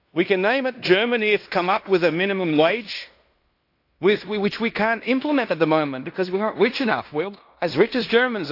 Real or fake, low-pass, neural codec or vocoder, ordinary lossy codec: fake; 5.4 kHz; codec, 16 kHz in and 24 kHz out, 0.9 kbps, LongCat-Audio-Codec, fine tuned four codebook decoder; AAC, 32 kbps